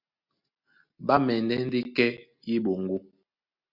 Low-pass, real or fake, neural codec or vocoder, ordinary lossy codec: 5.4 kHz; real; none; Opus, 64 kbps